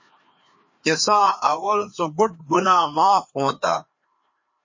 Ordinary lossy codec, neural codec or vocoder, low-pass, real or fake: MP3, 32 kbps; codec, 16 kHz, 2 kbps, FreqCodec, larger model; 7.2 kHz; fake